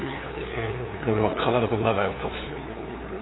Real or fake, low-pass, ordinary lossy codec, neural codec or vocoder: fake; 7.2 kHz; AAC, 16 kbps; codec, 16 kHz, 2 kbps, FunCodec, trained on LibriTTS, 25 frames a second